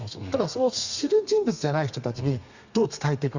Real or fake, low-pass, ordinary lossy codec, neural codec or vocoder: fake; 7.2 kHz; Opus, 64 kbps; codec, 32 kHz, 1.9 kbps, SNAC